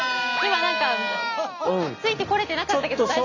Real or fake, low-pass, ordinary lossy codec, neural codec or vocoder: real; 7.2 kHz; none; none